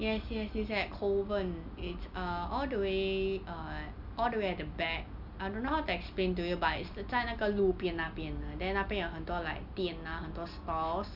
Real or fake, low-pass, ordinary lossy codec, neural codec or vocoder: real; 5.4 kHz; none; none